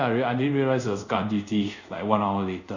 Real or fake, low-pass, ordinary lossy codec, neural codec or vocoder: fake; 7.2 kHz; none; codec, 24 kHz, 0.5 kbps, DualCodec